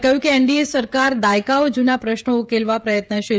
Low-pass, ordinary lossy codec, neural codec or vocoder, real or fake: none; none; codec, 16 kHz, 16 kbps, FreqCodec, smaller model; fake